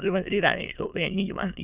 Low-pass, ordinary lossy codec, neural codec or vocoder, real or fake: 3.6 kHz; Opus, 64 kbps; autoencoder, 22.05 kHz, a latent of 192 numbers a frame, VITS, trained on many speakers; fake